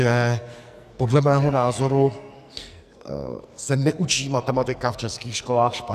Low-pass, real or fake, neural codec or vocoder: 14.4 kHz; fake; codec, 44.1 kHz, 2.6 kbps, SNAC